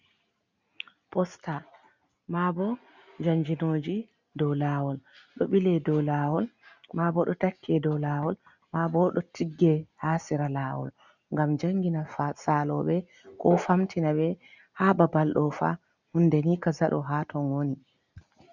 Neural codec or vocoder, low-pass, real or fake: none; 7.2 kHz; real